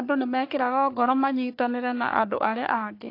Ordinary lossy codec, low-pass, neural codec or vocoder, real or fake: AAC, 32 kbps; 5.4 kHz; codec, 44.1 kHz, 3.4 kbps, Pupu-Codec; fake